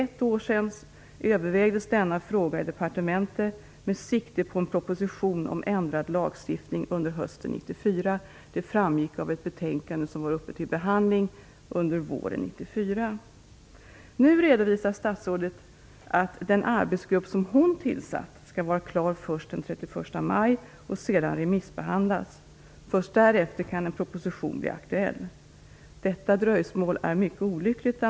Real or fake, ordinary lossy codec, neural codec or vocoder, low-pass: real; none; none; none